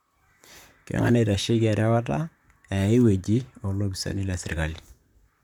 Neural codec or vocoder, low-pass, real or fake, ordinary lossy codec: vocoder, 44.1 kHz, 128 mel bands, Pupu-Vocoder; 19.8 kHz; fake; none